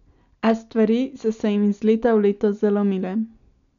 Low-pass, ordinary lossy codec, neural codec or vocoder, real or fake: 7.2 kHz; none; none; real